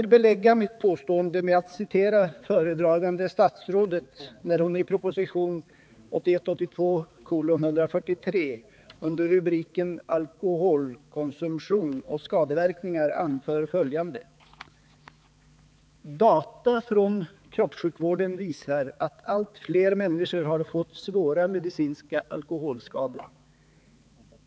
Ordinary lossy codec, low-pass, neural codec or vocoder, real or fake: none; none; codec, 16 kHz, 4 kbps, X-Codec, HuBERT features, trained on balanced general audio; fake